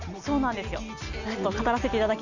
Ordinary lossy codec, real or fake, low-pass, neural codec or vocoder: none; real; 7.2 kHz; none